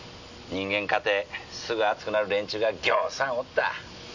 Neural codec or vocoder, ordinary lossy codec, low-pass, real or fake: none; none; 7.2 kHz; real